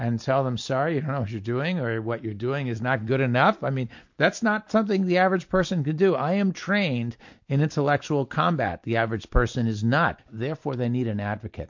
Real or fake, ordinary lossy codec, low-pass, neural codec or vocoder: real; MP3, 48 kbps; 7.2 kHz; none